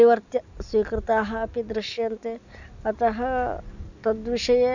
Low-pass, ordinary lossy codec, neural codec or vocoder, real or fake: 7.2 kHz; none; none; real